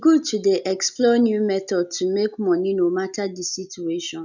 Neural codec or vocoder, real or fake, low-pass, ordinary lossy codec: none; real; 7.2 kHz; none